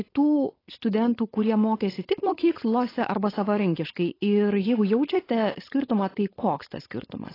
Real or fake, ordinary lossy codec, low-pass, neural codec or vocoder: fake; AAC, 24 kbps; 5.4 kHz; codec, 16 kHz, 4.8 kbps, FACodec